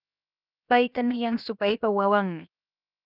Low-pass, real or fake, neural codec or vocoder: 5.4 kHz; fake; codec, 16 kHz, 0.7 kbps, FocalCodec